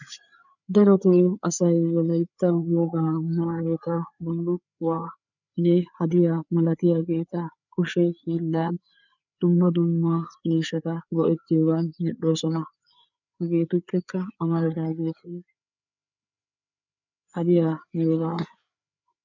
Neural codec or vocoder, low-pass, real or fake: codec, 16 kHz, 4 kbps, FreqCodec, larger model; 7.2 kHz; fake